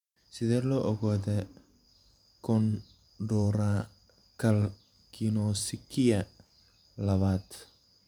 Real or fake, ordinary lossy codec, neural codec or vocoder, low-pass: fake; none; vocoder, 44.1 kHz, 128 mel bands every 512 samples, BigVGAN v2; 19.8 kHz